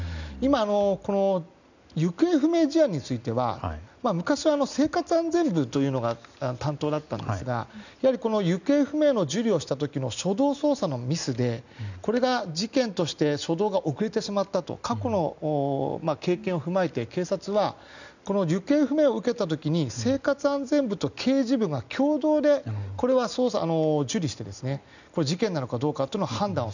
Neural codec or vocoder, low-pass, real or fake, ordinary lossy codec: none; 7.2 kHz; real; none